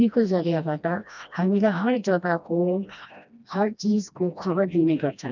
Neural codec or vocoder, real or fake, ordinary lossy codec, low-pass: codec, 16 kHz, 1 kbps, FreqCodec, smaller model; fake; none; 7.2 kHz